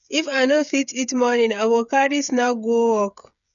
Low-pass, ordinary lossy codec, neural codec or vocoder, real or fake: 7.2 kHz; none; codec, 16 kHz, 8 kbps, FreqCodec, smaller model; fake